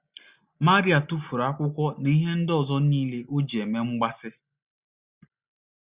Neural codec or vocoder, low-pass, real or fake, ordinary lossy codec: none; 3.6 kHz; real; Opus, 64 kbps